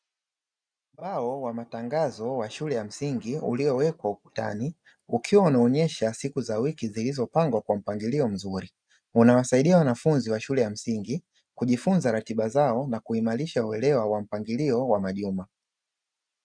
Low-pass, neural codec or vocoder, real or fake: 9.9 kHz; none; real